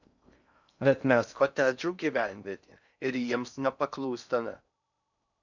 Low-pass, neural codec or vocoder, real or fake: 7.2 kHz; codec, 16 kHz in and 24 kHz out, 0.6 kbps, FocalCodec, streaming, 2048 codes; fake